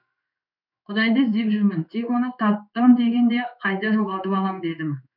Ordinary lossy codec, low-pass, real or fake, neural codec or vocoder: none; 5.4 kHz; fake; codec, 16 kHz in and 24 kHz out, 1 kbps, XY-Tokenizer